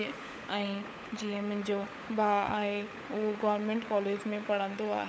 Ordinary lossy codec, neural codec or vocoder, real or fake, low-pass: none; codec, 16 kHz, 16 kbps, FunCodec, trained on LibriTTS, 50 frames a second; fake; none